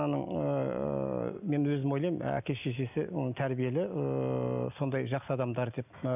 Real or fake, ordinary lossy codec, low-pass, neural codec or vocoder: real; none; 3.6 kHz; none